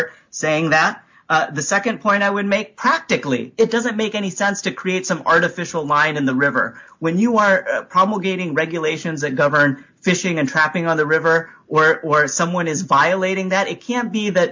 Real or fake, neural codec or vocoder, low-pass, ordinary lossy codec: real; none; 7.2 kHz; MP3, 64 kbps